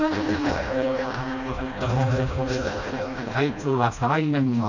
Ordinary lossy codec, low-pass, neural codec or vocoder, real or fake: none; 7.2 kHz; codec, 16 kHz, 1 kbps, FreqCodec, smaller model; fake